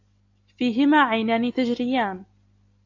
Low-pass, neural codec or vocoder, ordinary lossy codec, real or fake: 7.2 kHz; none; AAC, 48 kbps; real